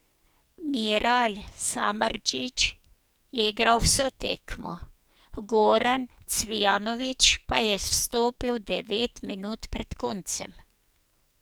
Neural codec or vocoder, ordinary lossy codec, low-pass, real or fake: codec, 44.1 kHz, 2.6 kbps, SNAC; none; none; fake